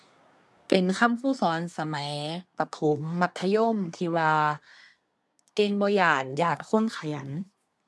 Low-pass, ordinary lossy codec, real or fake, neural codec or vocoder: none; none; fake; codec, 24 kHz, 1 kbps, SNAC